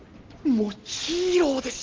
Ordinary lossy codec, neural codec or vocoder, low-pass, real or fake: Opus, 16 kbps; none; 7.2 kHz; real